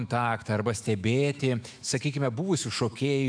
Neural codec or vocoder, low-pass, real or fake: autoencoder, 48 kHz, 128 numbers a frame, DAC-VAE, trained on Japanese speech; 9.9 kHz; fake